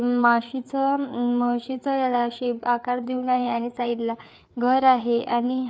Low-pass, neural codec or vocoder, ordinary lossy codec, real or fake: none; codec, 16 kHz, 4 kbps, FreqCodec, larger model; none; fake